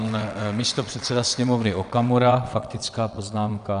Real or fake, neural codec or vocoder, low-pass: fake; vocoder, 22.05 kHz, 80 mel bands, WaveNeXt; 9.9 kHz